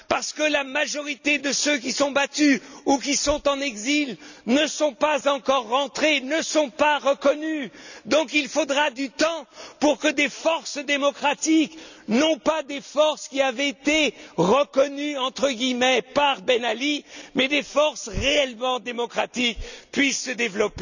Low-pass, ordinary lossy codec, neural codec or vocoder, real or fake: 7.2 kHz; none; none; real